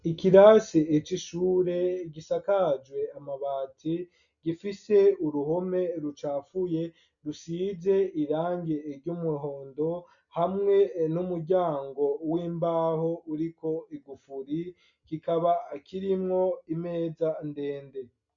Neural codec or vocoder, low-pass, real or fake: none; 7.2 kHz; real